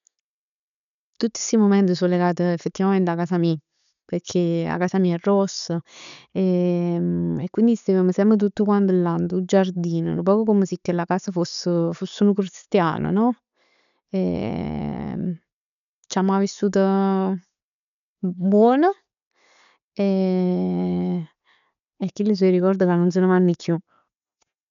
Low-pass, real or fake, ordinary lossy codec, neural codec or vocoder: 7.2 kHz; real; none; none